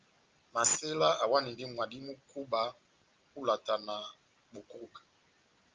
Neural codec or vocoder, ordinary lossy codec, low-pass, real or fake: none; Opus, 24 kbps; 7.2 kHz; real